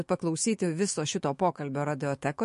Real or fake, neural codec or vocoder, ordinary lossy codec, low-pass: fake; autoencoder, 48 kHz, 128 numbers a frame, DAC-VAE, trained on Japanese speech; MP3, 48 kbps; 14.4 kHz